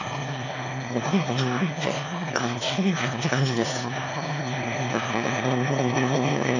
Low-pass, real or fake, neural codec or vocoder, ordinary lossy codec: 7.2 kHz; fake; autoencoder, 22.05 kHz, a latent of 192 numbers a frame, VITS, trained on one speaker; none